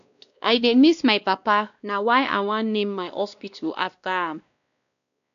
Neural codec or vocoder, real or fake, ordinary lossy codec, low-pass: codec, 16 kHz, 1 kbps, X-Codec, WavLM features, trained on Multilingual LibriSpeech; fake; none; 7.2 kHz